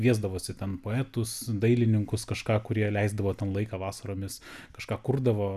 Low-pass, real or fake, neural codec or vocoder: 14.4 kHz; real; none